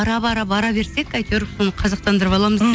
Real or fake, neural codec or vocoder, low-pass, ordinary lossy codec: real; none; none; none